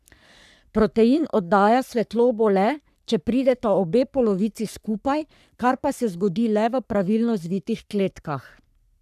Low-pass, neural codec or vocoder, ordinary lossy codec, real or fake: 14.4 kHz; codec, 44.1 kHz, 3.4 kbps, Pupu-Codec; none; fake